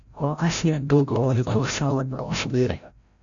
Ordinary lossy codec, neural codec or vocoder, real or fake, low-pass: MP3, 96 kbps; codec, 16 kHz, 0.5 kbps, FreqCodec, larger model; fake; 7.2 kHz